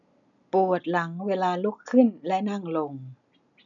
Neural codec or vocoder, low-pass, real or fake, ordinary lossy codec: none; 7.2 kHz; real; none